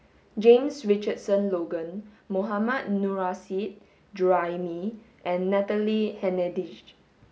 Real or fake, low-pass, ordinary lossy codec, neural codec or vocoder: real; none; none; none